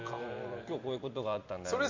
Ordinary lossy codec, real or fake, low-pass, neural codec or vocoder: MP3, 64 kbps; fake; 7.2 kHz; vocoder, 44.1 kHz, 128 mel bands every 256 samples, BigVGAN v2